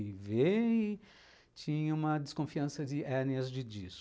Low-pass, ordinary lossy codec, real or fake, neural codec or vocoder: none; none; real; none